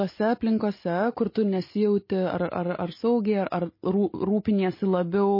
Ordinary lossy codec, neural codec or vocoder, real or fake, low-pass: MP3, 24 kbps; none; real; 5.4 kHz